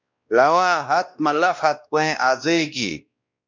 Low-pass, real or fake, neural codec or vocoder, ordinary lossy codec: 7.2 kHz; fake; codec, 16 kHz, 1 kbps, X-Codec, WavLM features, trained on Multilingual LibriSpeech; MP3, 64 kbps